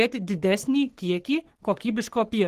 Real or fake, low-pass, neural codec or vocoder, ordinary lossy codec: fake; 14.4 kHz; codec, 44.1 kHz, 3.4 kbps, Pupu-Codec; Opus, 16 kbps